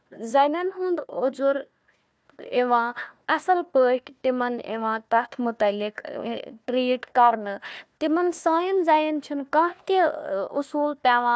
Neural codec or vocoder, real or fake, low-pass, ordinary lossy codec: codec, 16 kHz, 1 kbps, FunCodec, trained on Chinese and English, 50 frames a second; fake; none; none